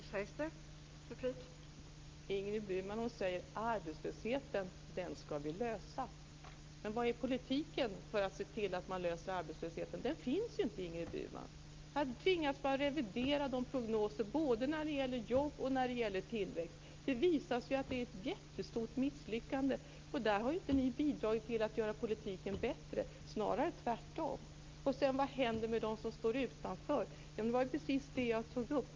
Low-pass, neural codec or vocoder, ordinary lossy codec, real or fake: 7.2 kHz; none; Opus, 24 kbps; real